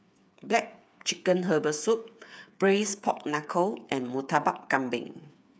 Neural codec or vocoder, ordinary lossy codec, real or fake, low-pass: codec, 16 kHz, 8 kbps, FreqCodec, smaller model; none; fake; none